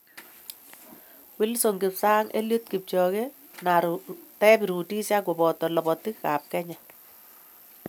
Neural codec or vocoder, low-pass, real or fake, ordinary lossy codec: none; none; real; none